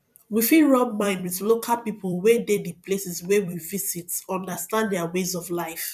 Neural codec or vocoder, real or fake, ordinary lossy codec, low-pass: vocoder, 44.1 kHz, 128 mel bands every 256 samples, BigVGAN v2; fake; none; 14.4 kHz